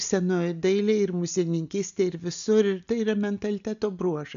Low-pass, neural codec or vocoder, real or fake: 7.2 kHz; none; real